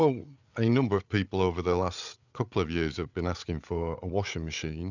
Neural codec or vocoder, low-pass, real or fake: codec, 16 kHz, 8 kbps, FreqCodec, larger model; 7.2 kHz; fake